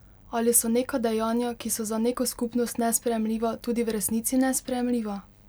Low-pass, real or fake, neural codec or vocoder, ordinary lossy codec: none; real; none; none